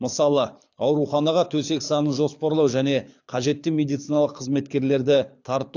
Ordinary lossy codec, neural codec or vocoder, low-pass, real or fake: AAC, 48 kbps; codec, 24 kHz, 6 kbps, HILCodec; 7.2 kHz; fake